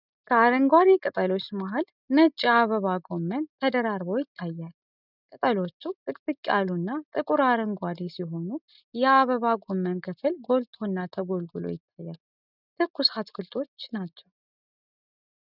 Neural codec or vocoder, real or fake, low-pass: none; real; 5.4 kHz